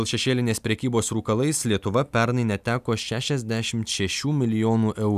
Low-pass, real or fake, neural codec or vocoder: 14.4 kHz; real; none